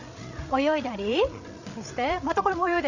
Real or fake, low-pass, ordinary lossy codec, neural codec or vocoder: fake; 7.2 kHz; none; codec, 16 kHz, 8 kbps, FreqCodec, larger model